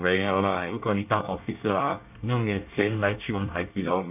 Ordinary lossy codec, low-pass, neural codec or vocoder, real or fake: none; 3.6 kHz; codec, 24 kHz, 1 kbps, SNAC; fake